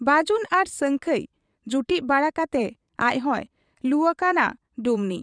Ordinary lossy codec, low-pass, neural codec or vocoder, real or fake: none; 9.9 kHz; vocoder, 22.05 kHz, 80 mel bands, Vocos; fake